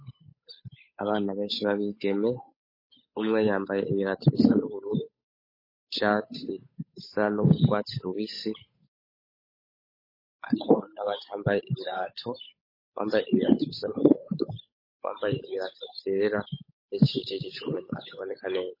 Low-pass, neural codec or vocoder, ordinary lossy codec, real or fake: 5.4 kHz; codec, 16 kHz, 8 kbps, FunCodec, trained on Chinese and English, 25 frames a second; MP3, 24 kbps; fake